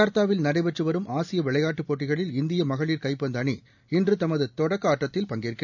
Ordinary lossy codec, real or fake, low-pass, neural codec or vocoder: none; real; 7.2 kHz; none